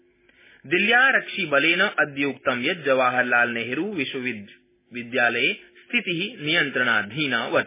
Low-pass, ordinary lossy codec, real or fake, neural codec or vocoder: 3.6 kHz; MP3, 16 kbps; real; none